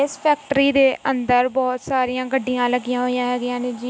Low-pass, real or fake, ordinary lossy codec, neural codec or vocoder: none; real; none; none